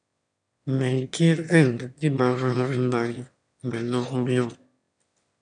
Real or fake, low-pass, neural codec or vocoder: fake; 9.9 kHz; autoencoder, 22.05 kHz, a latent of 192 numbers a frame, VITS, trained on one speaker